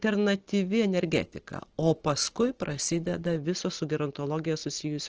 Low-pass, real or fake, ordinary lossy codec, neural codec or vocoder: 7.2 kHz; real; Opus, 16 kbps; none